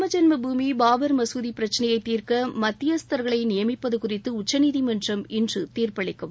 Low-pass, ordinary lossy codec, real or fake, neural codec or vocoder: none; none; real; none